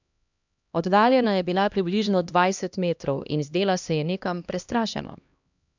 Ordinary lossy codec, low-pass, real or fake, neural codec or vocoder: none; 7.2 kHz; fake; codec, 16 kHz, 1 kbps, X-Codec, HuBERT features, trained on LibriSpeech